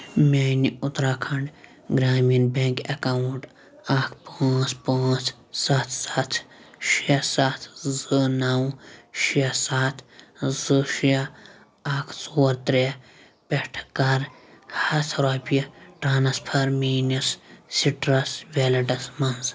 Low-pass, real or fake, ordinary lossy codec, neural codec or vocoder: none; real; none; none